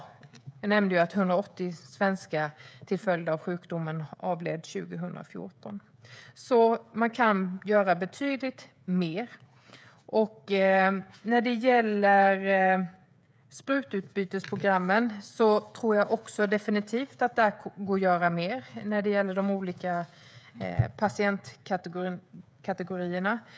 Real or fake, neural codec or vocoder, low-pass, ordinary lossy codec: fake; codec, 16 kHz, 16 kbps, FreqCodec, smaller model; none; none